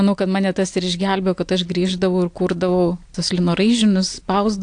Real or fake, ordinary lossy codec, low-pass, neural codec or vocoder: real; AAC, 64 kbps; 9.9 kHz; none